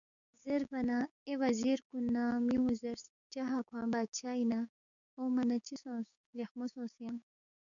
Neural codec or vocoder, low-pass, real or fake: codec, 16 kHz, 6 kbps, DAC; 7.2 kHz; fake